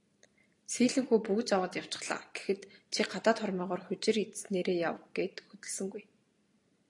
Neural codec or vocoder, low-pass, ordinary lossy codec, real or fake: none; 10.8 kHz; AAC, 48 kbps; real